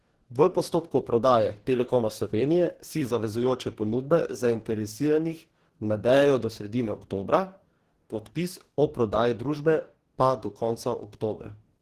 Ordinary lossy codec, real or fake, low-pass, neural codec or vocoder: Opus, 16 kbps; fake; 14.4 kHz; codec, 44.1 kHz, 2.6 kbps, DAC